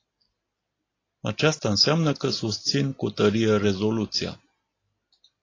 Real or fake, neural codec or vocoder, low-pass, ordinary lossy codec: real; none; 7.2 kHz; AAC, 32 kbps